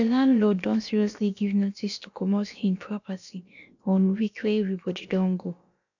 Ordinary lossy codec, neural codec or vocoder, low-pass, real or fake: none; codec, 16 kHz, about 1 kbps, DyCAST, with the encoder's durations; 7.2 kHz; fake